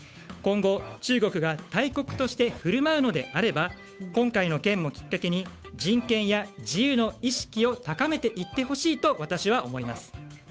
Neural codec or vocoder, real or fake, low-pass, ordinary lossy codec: codec, 16 kHz, 8 kbps, FunCodec, trained on Chinese and English, 25 frames a second; fake; none; none